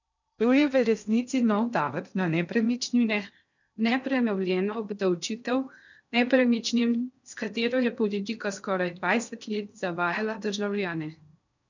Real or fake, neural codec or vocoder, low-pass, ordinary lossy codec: fake; codec, 16 kHz in and 24 kHz out, 0.6 kbps, FocalCodec, streaming, 2048 codes; 7.2 kHz; none